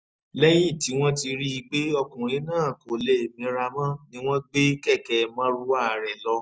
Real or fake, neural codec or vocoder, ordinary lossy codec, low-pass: real; none; none; none